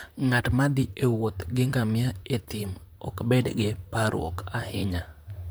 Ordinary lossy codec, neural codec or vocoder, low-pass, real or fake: none; vocoder, 44.1 kHz, 128 mel bands, Pupu-Vocoder; none; fake